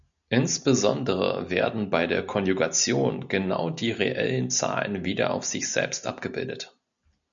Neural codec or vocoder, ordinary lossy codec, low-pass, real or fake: none; MP3, 96 kbps; 7.2 kHz; real